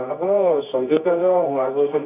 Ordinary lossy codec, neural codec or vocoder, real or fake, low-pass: none; codec, 24 kHz, 0.9 kbps, WavTokenizer, medium music audio release; fake; 3.6 kHz